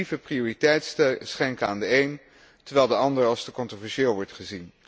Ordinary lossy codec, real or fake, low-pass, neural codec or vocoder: none; real; none; none